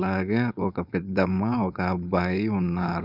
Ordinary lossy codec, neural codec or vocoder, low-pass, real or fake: none; codec, 16 kHz, 4.8 kbps, FACodec; 5.4 kHz; fake